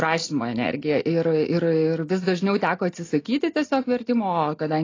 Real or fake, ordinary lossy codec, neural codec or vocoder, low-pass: real; AAC, 32 kbps; none; 7.2 kHz